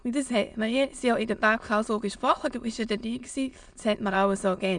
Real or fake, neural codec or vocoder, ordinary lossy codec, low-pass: fake; autoencoder, 22.05 kHz, a latent of 192 numbers a frame, VITS, trained on many speakers; none; 9.9 kHz